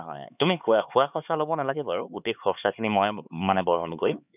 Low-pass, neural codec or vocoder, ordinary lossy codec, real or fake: 3.6 kHz; codec, 16 kHz, 4 kbps, X-Codec, HuBERT features, trained on LibriSpeech; none; fake